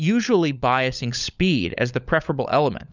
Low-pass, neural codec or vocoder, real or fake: 7.2 kHz; codec, 16 kHz, 16 kbps, FunCodec, trained on LibriTTS, 50 frames a second; fake